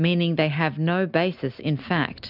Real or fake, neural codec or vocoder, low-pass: real; none; 5.4 kHz